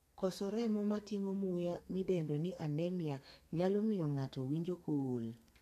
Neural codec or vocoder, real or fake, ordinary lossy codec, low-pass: codec, 32 kHz, 1.9 kbps, SNAC; fake; MP3, 96 kbps; 14.4 kHz